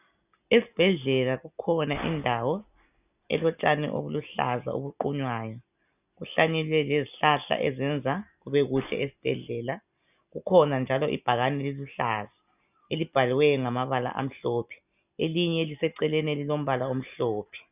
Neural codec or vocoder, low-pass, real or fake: none; 3.6 kHz; real